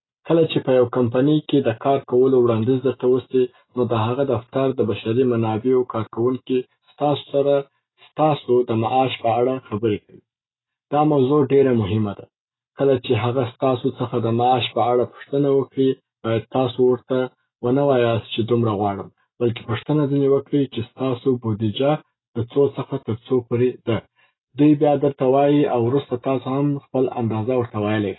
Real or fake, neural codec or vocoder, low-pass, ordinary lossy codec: real; none; 7.2 kHz; AAC, 16 kbps